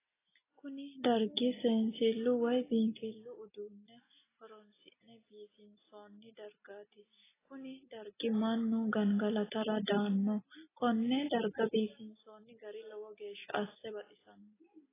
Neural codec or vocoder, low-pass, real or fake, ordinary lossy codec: none; 3.6 kHz; real; AAC, 16 kbps